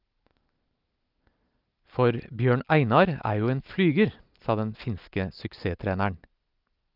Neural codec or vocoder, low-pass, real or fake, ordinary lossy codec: none; 5.4 kHz; real; Opus, 24 kbps